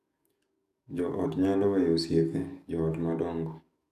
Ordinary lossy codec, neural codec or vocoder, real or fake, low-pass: none; codec, 44.1 kHz, 7.8 kbps, DAC; fake; 14.4 kHz